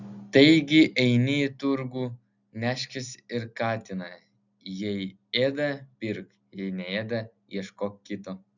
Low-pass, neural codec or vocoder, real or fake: 7.2 kHz; none; real